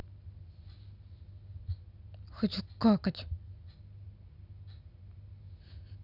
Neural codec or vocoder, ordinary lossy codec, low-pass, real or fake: none; none; 5.4 kHz; real